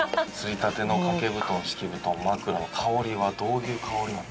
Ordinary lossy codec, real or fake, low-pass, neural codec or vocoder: none; real; none; none